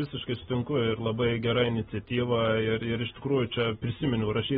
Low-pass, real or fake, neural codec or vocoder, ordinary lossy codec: 19.8 kHz; real; none; AAC, 16 kbps